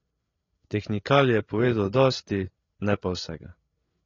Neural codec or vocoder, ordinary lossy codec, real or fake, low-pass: codec, 16 kHz, 8 kbps, FreqCodec, larger model; AAC, 32 kbps; fake; 7.2 kHz